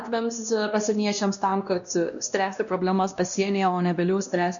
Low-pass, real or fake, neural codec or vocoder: 7.2 kHz; fake; codec, 16 kHz, 1 kbps, X-Codec, WavLM features, trained on Multilingual LibriSpeech